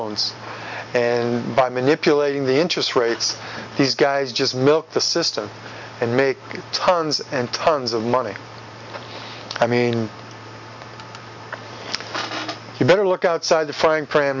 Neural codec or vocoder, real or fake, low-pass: none; real; 7.2 kHz